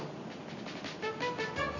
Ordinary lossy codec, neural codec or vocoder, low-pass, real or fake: none; none; 7.2 kHz; real